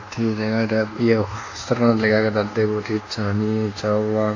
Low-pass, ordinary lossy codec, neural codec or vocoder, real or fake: 7.2 kHz; AAC, 48 kbps; codec, 24 kHz, 1.2 kbps, DualCodec; fake